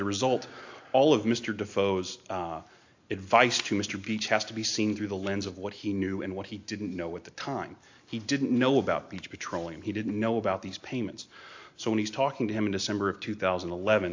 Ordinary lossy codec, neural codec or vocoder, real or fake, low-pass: AAC, 48 kbps; none; real; 7.2 kHz